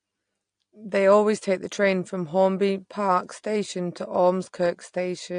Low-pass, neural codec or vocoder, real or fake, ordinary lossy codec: 9.9 kHz; none; real; AAC, 48 kbps